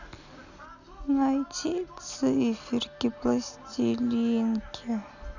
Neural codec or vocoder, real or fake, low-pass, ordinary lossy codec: none; real; 7.2 kHz; none